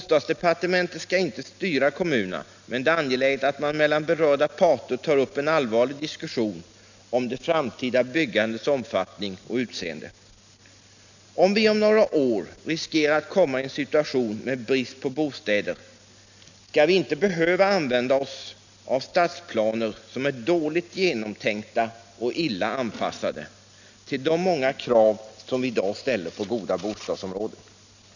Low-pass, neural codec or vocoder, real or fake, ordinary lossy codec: 7.2 kHz; none; real; none